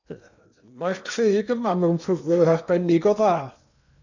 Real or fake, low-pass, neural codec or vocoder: fake; 7.2 kHz; codec, 16 kHz in and 24 kHz out, 0.8 kbps, FocalCodec, streaming, 65536 codes